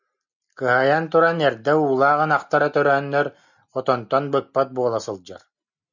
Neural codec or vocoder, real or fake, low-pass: none; real; 7.2 kHz